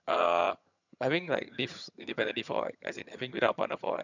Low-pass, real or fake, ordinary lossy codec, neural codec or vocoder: 7.2 kHz; fake; none; vocoder, 22.05 kHz, 80 mel bands, HiFi-GAN